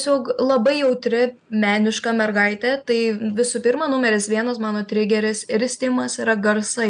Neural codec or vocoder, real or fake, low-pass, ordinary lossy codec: none; real; 9.9 kHz; AAC, 96 kbps